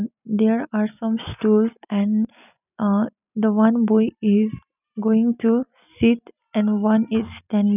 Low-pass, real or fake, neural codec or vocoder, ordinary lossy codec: 3.6 kHz; real; none; none